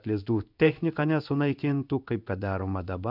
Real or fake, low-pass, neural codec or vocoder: fake; 5.4 kHz; codec, 16 kHz in and 24 kHz out, 1 kbps, XY-Tokenizer